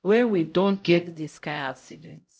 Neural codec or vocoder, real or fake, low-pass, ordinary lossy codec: codec, 16 kHz, 0.5 kbps, X-Codec, HuBERT features, trained on LibriSpeech; fake; none; none